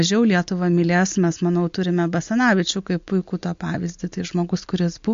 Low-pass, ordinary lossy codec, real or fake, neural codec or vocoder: 7.2 kHz; MP3, 48 kbps; real; none